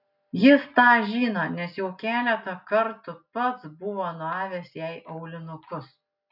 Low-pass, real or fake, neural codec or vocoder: 5.4 kHz; real; none